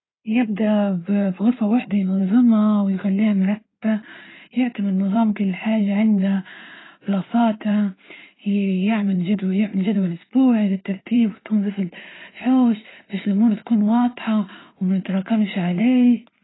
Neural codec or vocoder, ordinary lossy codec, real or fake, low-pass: codec, 16 kHz in and 24 kHz out, 2.2 kbps, FireRedTTS-2 codec; AAC, 16 kbps; fake; 7.2 kHz